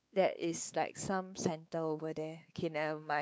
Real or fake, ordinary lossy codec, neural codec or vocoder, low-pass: fake; none; codec, 16 kHz, 4 kbps, X-Codec, WavLM features, trained on Multilingual LibriSpeech; none